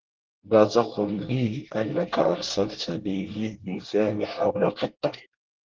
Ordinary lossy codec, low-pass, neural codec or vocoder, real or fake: Opus, 16 kbps; 7.2 kHz; codec, 24 kHz, 1 kbps, SNAC; fake